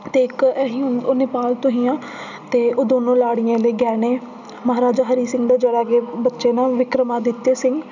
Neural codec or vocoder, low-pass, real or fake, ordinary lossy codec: none; 7.2 kHz; real; none